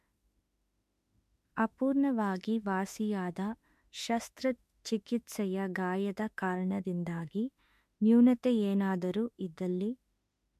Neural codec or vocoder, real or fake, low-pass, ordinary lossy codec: autoencoder, 48 kHz, 32 numbers a frame, DAC-VAE, trained on Japanese speech; fake; 14.4 kHz; MP3, 64 kbps